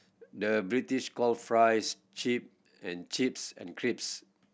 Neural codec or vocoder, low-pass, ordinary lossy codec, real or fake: none; none; none; real